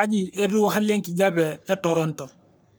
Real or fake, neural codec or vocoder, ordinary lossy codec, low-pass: fake; codec, 44.1 kHz, 3.4 kbps, Pupu-Codec; none; none